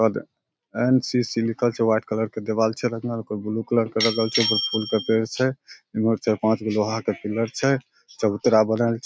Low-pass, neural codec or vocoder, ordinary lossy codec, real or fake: none; none; none; real